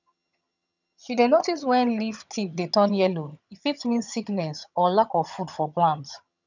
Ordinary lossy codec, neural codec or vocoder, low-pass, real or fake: none; vocoder, 22.05 kHz, 80 mel bands, HiFi-GAN; 7.2 kHz; fake